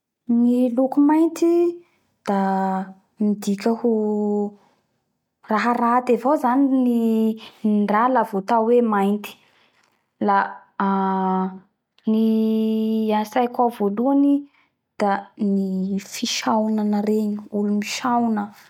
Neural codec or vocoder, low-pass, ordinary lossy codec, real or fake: none; 19.8 kHz; MP3, 96 kbps; real